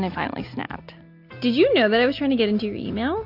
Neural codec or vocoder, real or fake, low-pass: none; real; 5.4 kHz